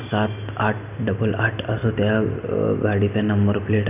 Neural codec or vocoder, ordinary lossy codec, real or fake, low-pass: none; none; real; 3.6 kHz